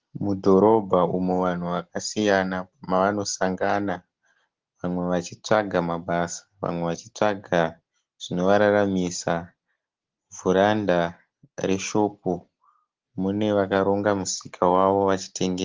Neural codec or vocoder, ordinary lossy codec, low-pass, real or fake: none; Opus, 16 kbps; 7.2 kHz; real